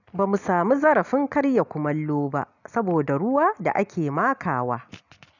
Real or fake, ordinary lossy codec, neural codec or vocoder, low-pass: real; none; none; 7.2 kHz